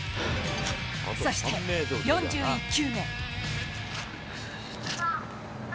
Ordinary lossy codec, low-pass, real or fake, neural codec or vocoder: none; none; real; none